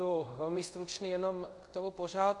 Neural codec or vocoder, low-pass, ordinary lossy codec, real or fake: codec, 24 kHz, 0.5 kbps, DualCodec; 10.8 kHz; MP3, 64 kbps; fake